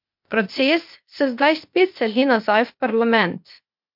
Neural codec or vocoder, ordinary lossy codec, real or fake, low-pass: codec, 16 kHz, 0.8 kbps, ZipCodec; MP3, 48 kbps; fake; 5.4 kHz